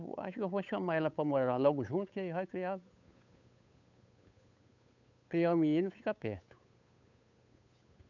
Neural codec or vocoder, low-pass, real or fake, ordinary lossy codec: codec, 16 kHz, 8 kbps, FunCodec, trained on Chinese and English, 25 frames a second; 7.2 kHz; fake; none